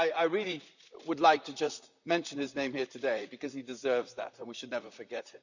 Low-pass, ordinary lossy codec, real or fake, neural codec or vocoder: 7.2 kHz; none; fake; vocoder, 44.1 kHz, 128 mel bands, Pupu-Vocoder